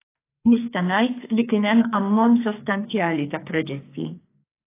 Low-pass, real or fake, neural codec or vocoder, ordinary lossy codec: 3.6 kHz; fake; codec, 44.1 kHz, 2.6 kbps, SNAC; AAC, 24 kbps